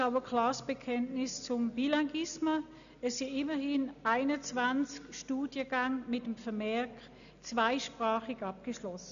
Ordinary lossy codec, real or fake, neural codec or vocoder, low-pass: none; real; none; 7.2 kHz